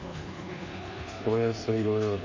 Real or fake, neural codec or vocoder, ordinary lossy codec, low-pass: fake; codec, 24 kHz, 1.2 kbps, DualCodec; MP3, 48 kbps; 7.2 kHz